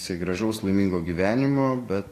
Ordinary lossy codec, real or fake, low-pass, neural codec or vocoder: AAC, 48 kbps; fake; 14.4 kHz; codec, 44.1 kHz, 7.8 kbps, DAC